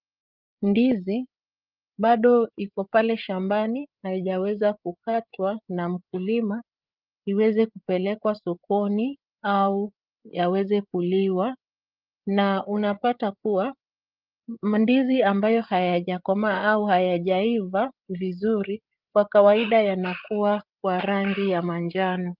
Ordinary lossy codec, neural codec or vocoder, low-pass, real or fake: Opus, 24 kbps; codec, 16 kHz, 8 kbps, FreqCodec, larger model; 5.4 kHz; fake